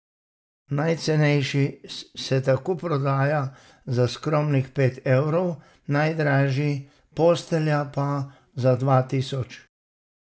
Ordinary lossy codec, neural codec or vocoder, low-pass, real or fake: none; none; none; real